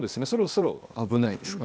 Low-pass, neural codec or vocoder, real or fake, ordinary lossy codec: none; codec, 16 kHz, 0.8 kbps, ZipCodec; fake; none